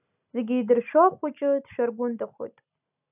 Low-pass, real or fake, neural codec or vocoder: 3.6 kHz; real; none